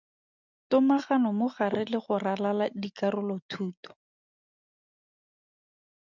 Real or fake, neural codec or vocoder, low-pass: real; none; 7.2 kHz